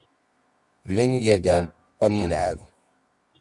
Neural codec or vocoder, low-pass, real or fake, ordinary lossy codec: codec, 24 kHz, 0.9 kbps, WavTokenizer, medium music audio release; 10.8 kHz; fake; Opus, 64 kbps